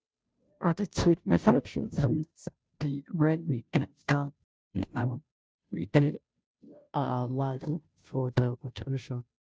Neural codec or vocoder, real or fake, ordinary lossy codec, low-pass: codec, 16 kHz, 0.5 kbps, FunCodec, trained on Chinese and English, 25 frames a second; fake; none; none